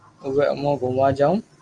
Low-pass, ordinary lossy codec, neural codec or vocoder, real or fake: 10.8 kHz; Opus, 24 kbps; none; real